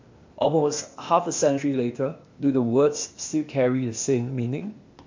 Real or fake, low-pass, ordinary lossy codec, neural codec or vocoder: fake; 7.2 kHz; MP3, 48 kbps; codec, 16 kHz, 0.8 kbps, ZipCodec